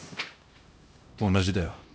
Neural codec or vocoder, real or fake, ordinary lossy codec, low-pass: codec, 16 kHz, 1 kbps, X-Codec, HuBERT features, trained on LibriSpeech; fake; none; none